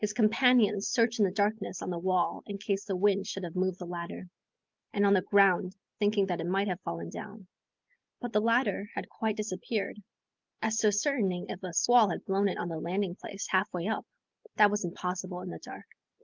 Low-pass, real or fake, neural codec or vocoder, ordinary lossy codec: 7.2 kHz; real; none; Opus, 24 kbps